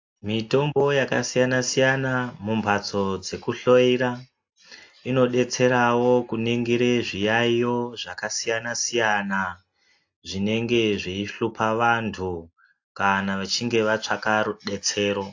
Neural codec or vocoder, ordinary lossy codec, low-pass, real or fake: none; AAC, 48 kbps; 7.2 kHz; real